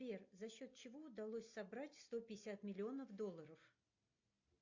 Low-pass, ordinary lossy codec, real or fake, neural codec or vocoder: 7.2 kHz; MP3, 48 kbps; real; none